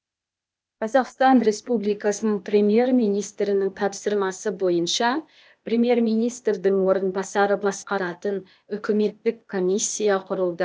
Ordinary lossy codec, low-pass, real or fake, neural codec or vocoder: none; none; fake; codec, 16 kHz, 0.8 kbps, ZipCodec